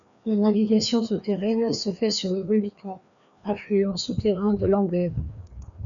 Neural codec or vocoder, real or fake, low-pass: codec, 16 kHz, 2 kbps, FreqCodec, larger model; fake; 7.2 kHz